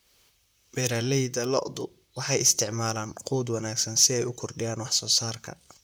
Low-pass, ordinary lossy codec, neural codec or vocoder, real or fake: none; none; vocoder, 44.1 kHz, 128 mel bands, Pupu-Vocoder; fake